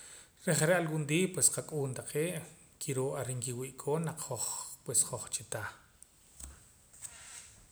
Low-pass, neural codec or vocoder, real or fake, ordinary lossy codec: none; none; real; none